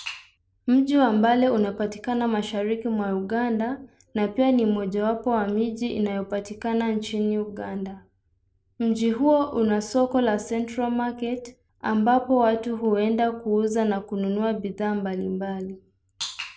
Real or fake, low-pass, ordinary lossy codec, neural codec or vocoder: real; none; none; none